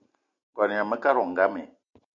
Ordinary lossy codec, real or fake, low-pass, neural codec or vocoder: MP3, 96 kbps; real; 7.2 kHz; none